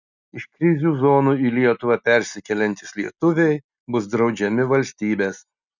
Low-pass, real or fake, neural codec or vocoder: 7.2 kHz; real; none